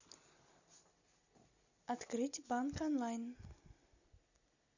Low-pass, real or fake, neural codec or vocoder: 7.2 kHz; real; none